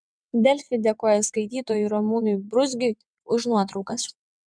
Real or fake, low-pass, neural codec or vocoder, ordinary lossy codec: fake; 9.9 kHz; vocoder, 22.05 kHz, 80 mel bands, WaveNeXt; MP3, 96 kbps